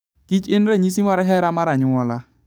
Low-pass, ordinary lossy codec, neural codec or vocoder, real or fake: none; none; codec, 44.1 kHz, 7.8 kbps, DAC; fake